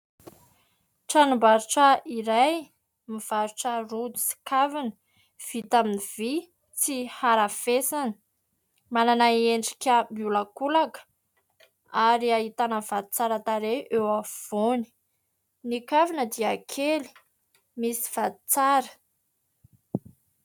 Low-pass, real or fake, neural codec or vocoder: 19.8 kHz; real; none